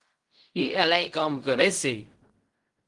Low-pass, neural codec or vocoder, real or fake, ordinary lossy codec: 10.8 kHz; codec, 16 kHz in and 24 kHz out, 0.4 kbps, LongCat-Audio-Codec, fine tuned four codebook decoder; fake; Opus, 24 kbps